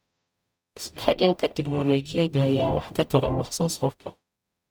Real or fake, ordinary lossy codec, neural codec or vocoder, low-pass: fake; none; codec, 44.1 kHz, 0.9 kbps, DAC; none